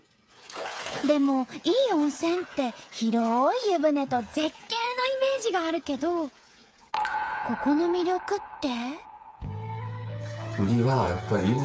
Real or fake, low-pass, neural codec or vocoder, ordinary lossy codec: fake; none; codec, 16 kHz, 8 kbps, FreqCodec, smaller model; none